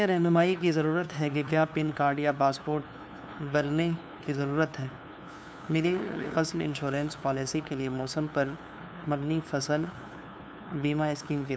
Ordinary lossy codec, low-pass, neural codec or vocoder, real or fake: none; none; codec, 16 kHz, 2 kbps, FunCodec, trained on LibriTTS, 25 frames a second; fake